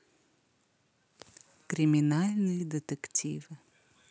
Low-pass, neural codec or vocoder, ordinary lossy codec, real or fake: none; none; none; real